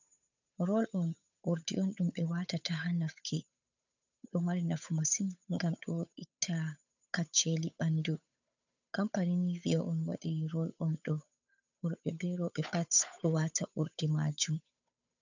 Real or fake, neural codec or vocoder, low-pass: fake; codec, 16 kHz, 8 kbps, FunCodec, trained on Chinese and English, 25 frames a second; 7.2 kHz